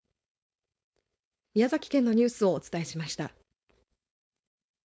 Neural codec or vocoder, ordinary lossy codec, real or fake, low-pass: codec, 16 kHz, 4.8 kbps, FACodec; none; fake; none